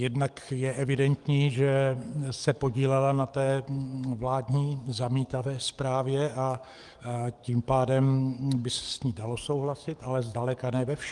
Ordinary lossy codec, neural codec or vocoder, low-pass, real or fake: Opus, 32 kbps; codec, 44.1 kHz, 7.8 kbps, DAC; 10.8 kHz; fake